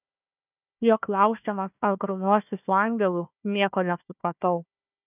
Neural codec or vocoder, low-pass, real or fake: codec, 16 kHz, 1 kbps, FunCodec, trained on Chinese and English, 50 frames a second; 3.6 kHz; fake